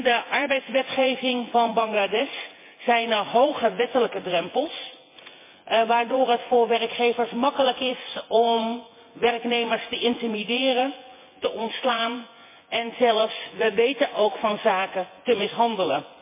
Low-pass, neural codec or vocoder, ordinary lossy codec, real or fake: 3.6 kHz; vocoder, 24 kHz, 100 mel bands, Vocos; MP3, 24 kbps; fake